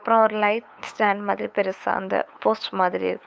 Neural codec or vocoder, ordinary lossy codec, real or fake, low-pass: codec, 16 kHz, 8 kbps, FunCodec, trained on LibriTTS, 25 frames a second; none; fake; none